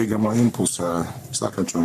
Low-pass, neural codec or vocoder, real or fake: 14.4 kHz; codec, 44.1 kHz, 3.4 kbps, Pupu-Codec; fake